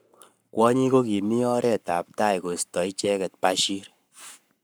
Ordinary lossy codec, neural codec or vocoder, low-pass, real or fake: none; codec, 44.1 kHz, 7.8 kbps, Pupu-Codec; none; fake